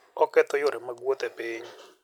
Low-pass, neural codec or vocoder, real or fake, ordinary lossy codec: 19.8 kHz; none; real; none